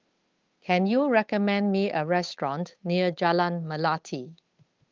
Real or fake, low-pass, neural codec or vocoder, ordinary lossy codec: fake; 7.2 kHz; codec, 16 kHz, 8 kbps, FunCodec, trained on Chinese and English, 25 frames a second; Opus, 32 kbps